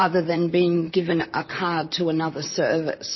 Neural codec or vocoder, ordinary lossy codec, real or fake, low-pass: vocoder, 44.1 kHz, 128 mel bands, Pupu-Vocoder; MP3, 24 kbps; fake; 7.2 kHz